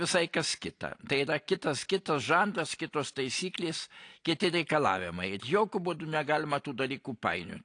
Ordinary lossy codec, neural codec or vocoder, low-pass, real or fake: AAC, 48 kbps; none; 9.9 kHz; real